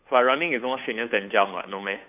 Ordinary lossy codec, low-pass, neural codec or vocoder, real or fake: none; 3.6 kHz; codec, 16 kHz, 2 kbps, FunCodec, trained on Chinese and English, 25 frames a second; fake